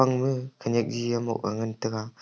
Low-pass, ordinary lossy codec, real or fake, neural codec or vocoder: none; none; real; none